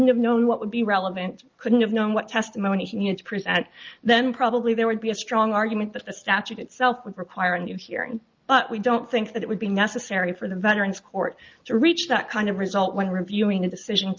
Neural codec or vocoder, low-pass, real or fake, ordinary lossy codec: none; 7.2 kHz; real; Opus, 24 kbps